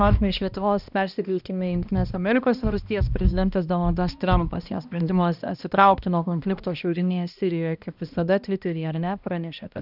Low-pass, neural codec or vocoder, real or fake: 5.4 kHz; codec, 16 kHz, 1 kbps, X-Codec, HuBERT features, trained on balanced general audio; fake